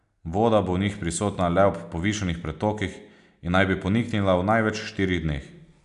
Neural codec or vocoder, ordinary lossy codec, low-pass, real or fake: none; none; 10.8 kHz; real